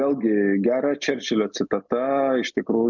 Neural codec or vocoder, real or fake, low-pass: none; real; 7.2 kHz